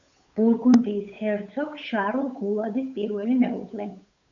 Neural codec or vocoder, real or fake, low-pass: codec, 16 kHz, 8 kbps, FunCodec, trained on Chinese and English, 25 frames a second; fake; 7.2 kHz